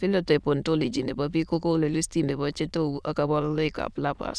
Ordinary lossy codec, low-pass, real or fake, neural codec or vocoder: none; none; fake; autoencoder, 22.05 kHz, a latent of 192 numbers a frame, VITS, trained on many speakers